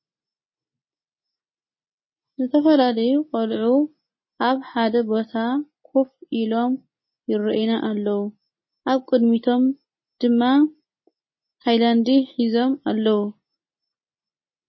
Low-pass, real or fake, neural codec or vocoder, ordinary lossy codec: 7.2 kHz; real; none; MP3, 24 kbps